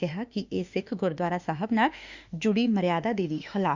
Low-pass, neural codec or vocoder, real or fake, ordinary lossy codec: 7.2 kHz; autoencoder, 48 kHz, 32 numbers a frame, DAC-VAE, trained on Japanese speech; fake; Opus, 64 kbps